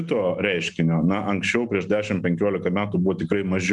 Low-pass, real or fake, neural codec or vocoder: 10.8 kHz; real; none